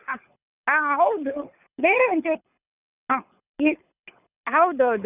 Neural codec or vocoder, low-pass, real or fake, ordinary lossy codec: codec, 24 kHz, 6 kbps, HILCodec; 3.6 kHz; fake; none